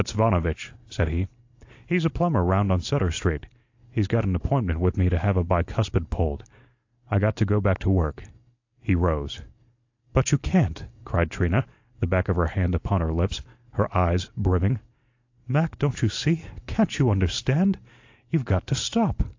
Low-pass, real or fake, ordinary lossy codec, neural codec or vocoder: 7.2 kHz; real; AAC, 48 kbps; none